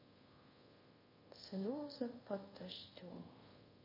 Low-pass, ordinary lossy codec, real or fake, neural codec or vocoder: 5.4 kHz; MP3, 24 kbps; fake; codec, 24 kHz, 0.5 kbps, DualCodec